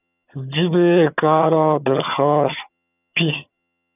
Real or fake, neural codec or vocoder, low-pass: fake; vocoder, 22.05 kHz, 80 mel bands, HiFi-GAN; 3.6 kHz